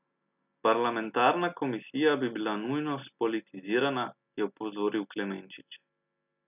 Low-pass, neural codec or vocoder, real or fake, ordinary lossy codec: 3.6 kHz; none; real; none